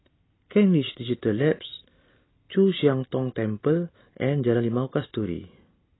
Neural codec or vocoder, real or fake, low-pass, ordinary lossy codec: none; real; 7.2 kHz; AAC, 16 kbps